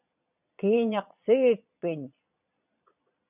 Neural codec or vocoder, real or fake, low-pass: vocoder, 22.05 kHz, 80 mel bands, Vocos; fake; 3.6 kHz